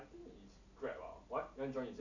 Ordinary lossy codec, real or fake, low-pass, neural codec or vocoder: none; real; 7.2 kHz; none